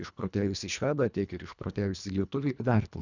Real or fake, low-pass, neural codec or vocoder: fake; 7.2 kHz; codec, 24 kHz, 1.5 kbps, HILCodec